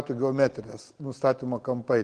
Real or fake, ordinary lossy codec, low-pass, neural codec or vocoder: real; Opus, 16 kbps; 9.9 kHz; none